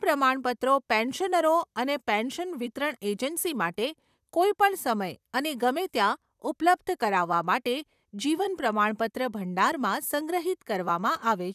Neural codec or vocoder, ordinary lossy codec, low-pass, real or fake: vocoder, 44.1 kHz, 128 mel bands, Pupu-Vocoder; none; 14.4 kHz; fake